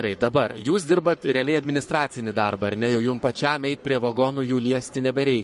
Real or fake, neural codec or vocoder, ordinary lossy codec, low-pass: fake; codec, 44.1 kHz, 3.4 kbps, Pupu-Codec; MP3, 48 kbps; 14.4 kHz